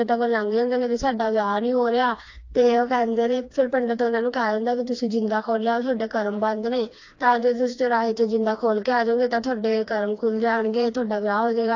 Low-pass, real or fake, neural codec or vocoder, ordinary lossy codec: 7.2 kHz; fake; codec, 16 kHz, 2 kbps, FreqCodec, smaller model; AAC, 48 kbps